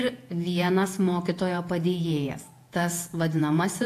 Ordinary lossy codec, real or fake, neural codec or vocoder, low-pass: AAC, 64 kbps; fake; vocoder, 48 kHz, 128 mel bands, Vocos; 14.4 kHz